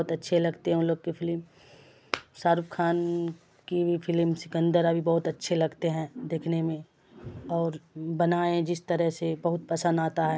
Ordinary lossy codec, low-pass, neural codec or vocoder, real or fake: none; none; none; real